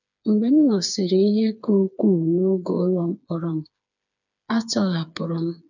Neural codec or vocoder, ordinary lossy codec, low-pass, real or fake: codec, 16 kHz, 4 kbps, FreqCodec, smaller model; none; 7.2 kHz; fake